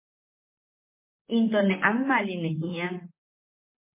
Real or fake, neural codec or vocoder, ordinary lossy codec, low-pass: fake; vocoder, 44.1 kHz, 128 mel bands, Pupu-Vocoder; MP3, 24 kbps; 3.6 kHz